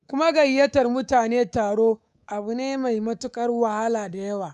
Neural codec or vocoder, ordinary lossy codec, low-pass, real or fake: codec, 24 kHz, 3.1 kbps, DualCodec; none; 10.8 kHz; fake